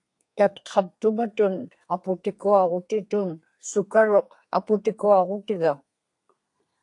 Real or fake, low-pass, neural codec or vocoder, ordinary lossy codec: fake; 10.8 kHz; codec, 32 kHz, 1.9 kbps, SNAC; AAC, 48 kbps